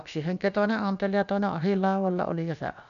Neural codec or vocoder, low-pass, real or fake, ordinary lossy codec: codec, 16 kHz, about 1 kbps, DyCAST, with the encoder's durations; 7.2 kHz; fake; MP3, 96 kbps